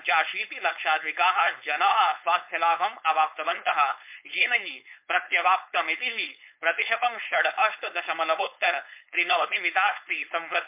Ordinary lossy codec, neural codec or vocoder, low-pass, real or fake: MP3, 32 kbps; codec, 16 kHz, 4.8 kbps, FACodec; 3.6 kHz; fake